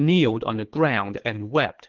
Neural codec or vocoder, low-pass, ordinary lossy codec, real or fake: codec, 24 kHz, 3 kbps, HILCodec; 7.2 kHz; Opus, 16 kbps; fake